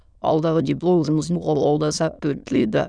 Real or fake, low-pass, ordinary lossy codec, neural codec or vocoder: fake; 9.9 kHz; none; autoencoder, 22.05 kHz, a latent of 192 numbers a frame, VITS, trained on many speakers